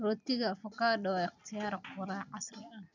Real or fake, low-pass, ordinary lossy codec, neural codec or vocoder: real; 7.2 kHz; none; none